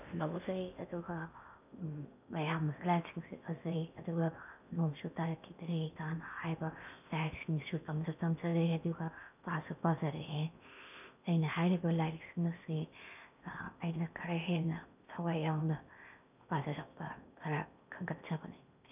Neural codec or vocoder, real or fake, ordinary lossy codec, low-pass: codec, 16 kHz in and 24 kHz out, 0.6 kbps, FocalCodec, streaming, 2048 codes; fake; none; 3.6 kHz